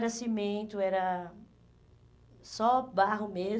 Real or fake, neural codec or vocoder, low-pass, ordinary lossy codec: real; none; none; none